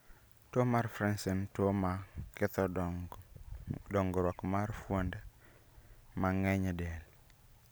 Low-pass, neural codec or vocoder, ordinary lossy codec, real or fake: none; none; none; real